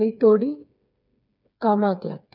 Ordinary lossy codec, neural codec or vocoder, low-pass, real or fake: none; codec, 16 kHz, 4 kbps, FreqCodec, smaller model; 5.4 kHz; fake